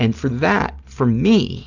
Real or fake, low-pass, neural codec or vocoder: real; 7.2 kHz; none